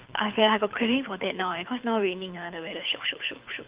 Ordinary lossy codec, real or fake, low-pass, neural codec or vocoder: Opus, 24 kbps; fake; 3.6 kHz; codec, 24 kHz, 6 kbps, HILCodec